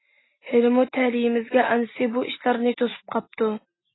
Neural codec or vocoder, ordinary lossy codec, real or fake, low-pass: none; AAC, 16 kbps; real; 7.2 kHz